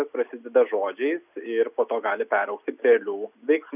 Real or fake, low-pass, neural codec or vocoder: real; 3.6 kHz; none